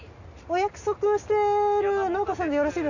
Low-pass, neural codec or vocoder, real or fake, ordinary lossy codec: 7.2 kHz; none; real; none